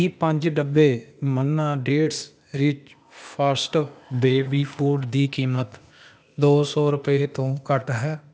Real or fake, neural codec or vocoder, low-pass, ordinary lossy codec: fake; codec, 16 kHz, 0.8 kbps, ZipCodec; none; none